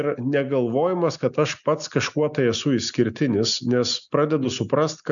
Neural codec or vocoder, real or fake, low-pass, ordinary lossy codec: none; real; 7.2 kHz; MP3, 96 kbps